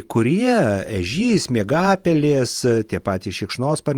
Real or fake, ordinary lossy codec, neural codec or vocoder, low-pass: fake; Opus, 32 kbps; vocoder, 48 kHz, 128 mel bands, Vocos; 19.8 kHz